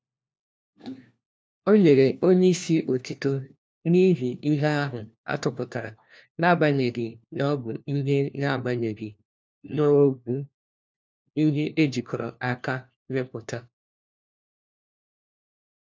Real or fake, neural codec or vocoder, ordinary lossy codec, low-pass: fake; codec, 16 kHz, 1 kbps, FunCodec, trained on LibriTTS, 50 frames a second; none; none